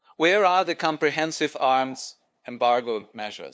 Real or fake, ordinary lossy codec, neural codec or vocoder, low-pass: fake; none; codec, 16 kHz, 2 kbps, FunCodec, trained on LibriTTS, 25 frames a second; none